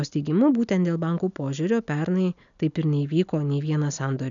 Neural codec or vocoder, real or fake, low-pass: none; real; 7.2 kHz